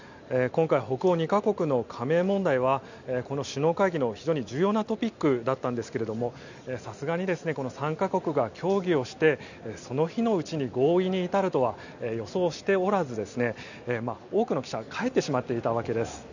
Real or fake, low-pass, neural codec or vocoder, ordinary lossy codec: real; 7.2 kHz; none; none